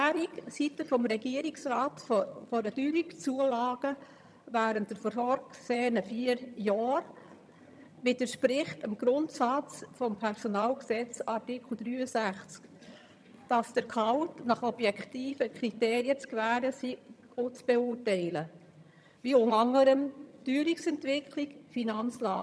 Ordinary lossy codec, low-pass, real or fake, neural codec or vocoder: none; none; fake; vocoder, 22.05 kHz, 80 mel bands, HiFi-GAN